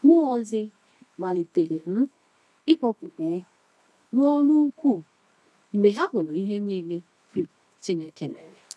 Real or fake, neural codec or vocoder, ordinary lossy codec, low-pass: fake; codec, 24 kHz, 0.9 kbps, WavTokenizer, medium music audio release; none; none